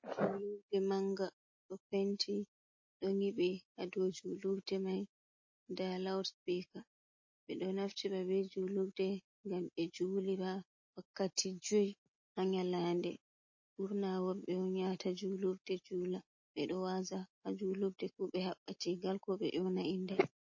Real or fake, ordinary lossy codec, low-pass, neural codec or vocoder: real; MP3, 32 kbps; 7.2 kHz; none